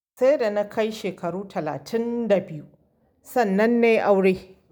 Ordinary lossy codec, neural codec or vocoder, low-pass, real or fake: none; none; none; real